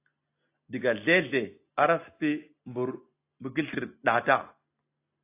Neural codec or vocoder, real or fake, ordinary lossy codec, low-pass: none; real; AAC, 24 kbps; 3.6 kHz